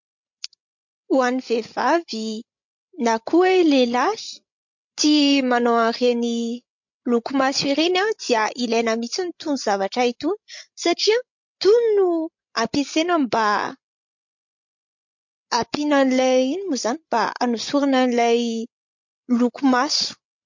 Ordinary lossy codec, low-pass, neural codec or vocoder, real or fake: MP3, 48 kbps; 7.2 kHz; codec, 16 kHz, 16 kbps, FreqCodec, larger model; fake